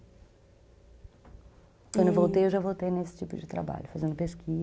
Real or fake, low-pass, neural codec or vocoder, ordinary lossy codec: real; none; none; none